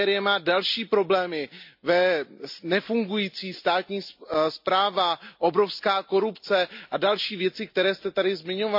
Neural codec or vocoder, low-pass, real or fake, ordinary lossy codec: none; 5.4 kHz; real; MP3, 48 kbps